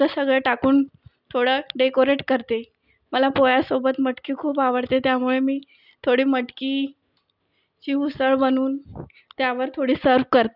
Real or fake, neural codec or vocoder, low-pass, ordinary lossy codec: real; none; 5.4 kHz; none